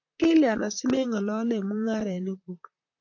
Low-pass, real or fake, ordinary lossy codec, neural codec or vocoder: 7.2 kHz; fake; AAC, 48 kbps; vocoder, 44.1 kHz, 128 mel bands, Pupu-Vocoder